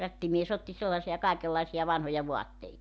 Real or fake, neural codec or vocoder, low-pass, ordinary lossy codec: real; none; none; none